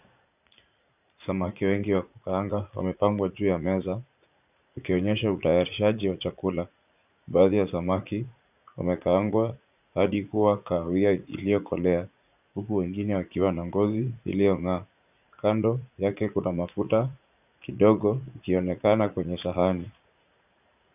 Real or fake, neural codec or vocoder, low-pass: fake; vocoder, 44.1 kHz, 80 mel bands, Vocos; 3.6 kHz